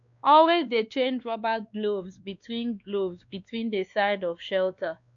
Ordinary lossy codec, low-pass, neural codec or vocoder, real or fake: none; 7.2 kHz; codec, 16 kHz, 2 kbps, X-Codec, WavLM features, trained on Multilingual LibriSpeech; fake